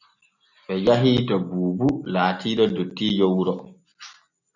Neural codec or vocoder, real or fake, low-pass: none; real; 7.2 kHz